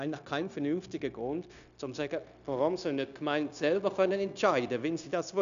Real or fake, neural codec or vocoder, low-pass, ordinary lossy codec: fake; codec, 16 kHz, 0.9 kbps, LongCat-Audio-Codec; 7.2 kHz; none